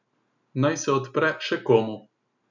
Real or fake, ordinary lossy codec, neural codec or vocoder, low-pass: real; none; none; 7.2 kHz